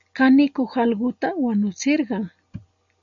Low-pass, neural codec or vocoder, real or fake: 7.2 kHz; none; real